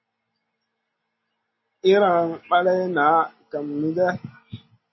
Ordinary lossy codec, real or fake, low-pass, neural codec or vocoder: MP3, 24 kbps; real; 7.2 kHz; none